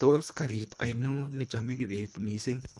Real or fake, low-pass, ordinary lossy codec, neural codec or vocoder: fake; none; none; codec, 24 kHz, 1.5 kbps, HILCodec